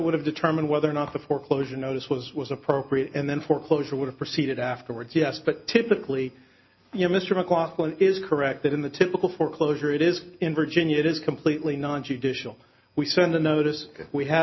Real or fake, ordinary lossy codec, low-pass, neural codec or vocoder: real; MP3, 24 kbps; 7.2 kHz; none